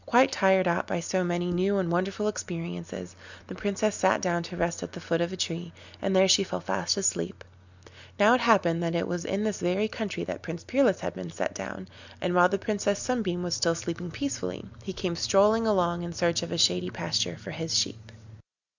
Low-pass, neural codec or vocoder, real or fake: 7.2 kHz; none; real